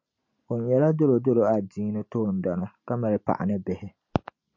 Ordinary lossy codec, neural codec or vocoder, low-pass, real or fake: MP3, 48 kbps; none; 7.2 kHz; real